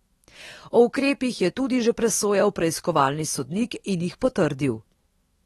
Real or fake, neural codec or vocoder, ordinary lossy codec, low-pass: fake; autoencoder, 48 kHz, 128 numbers a frame, DAC-VAE, trained on Japanese speech; AAC, 32 kbps; 19.8 kHz